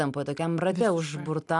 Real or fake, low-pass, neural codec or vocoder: real; 10.8 kHz; none